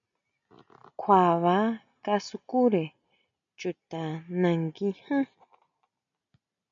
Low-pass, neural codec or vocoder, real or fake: 7.2 kHz; none; real